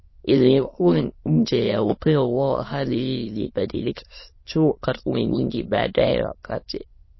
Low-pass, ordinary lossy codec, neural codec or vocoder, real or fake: 7.2 kHz; MP3, 24 kbps; autoencoder, 22.05 kHz, a latent of 192 numbers a frame, VITS, trained on many speakers; fake